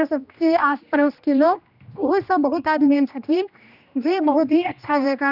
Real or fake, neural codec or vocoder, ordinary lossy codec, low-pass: fake; codec, 16 kHz, 1 kbps, X-Codec, HuBERT features, trained on general audio; none; 5.4 kHz